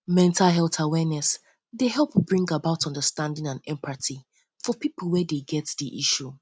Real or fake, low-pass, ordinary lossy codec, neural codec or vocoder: real; none; none; none